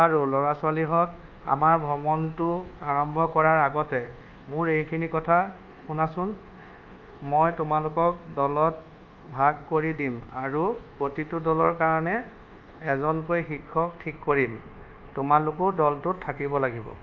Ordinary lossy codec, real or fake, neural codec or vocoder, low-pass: Opus, 24 kbps; fake; codec, 24 kHz, 1.2 kbps, DualCodec; 7.2 kHz